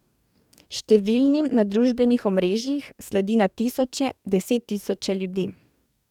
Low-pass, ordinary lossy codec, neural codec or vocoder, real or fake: 19.8 kHz; none; codec, 44.1 kHz, 2.6 kbps, DAC; fake